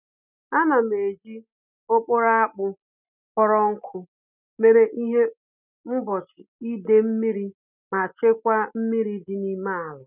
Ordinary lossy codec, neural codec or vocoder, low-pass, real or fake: none; none; 3.6 kHz; real